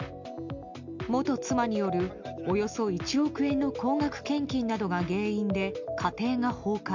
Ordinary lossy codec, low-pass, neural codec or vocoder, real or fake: MP3, 64 kbps; 7.2 kHz; none; real